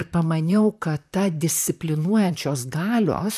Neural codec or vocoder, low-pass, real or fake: vocoder, 44.1 kHz, 128 mel bands, Pupu-Vocoder; 14.4 kHz; fake